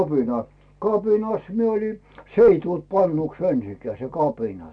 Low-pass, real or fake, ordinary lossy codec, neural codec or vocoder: 9.9 kHz; real; none; none